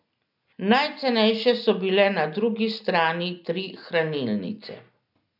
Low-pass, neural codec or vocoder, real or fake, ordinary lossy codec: 5.4 kHz; none; real; none